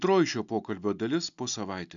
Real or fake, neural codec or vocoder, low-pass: real; none; 7.2 kHz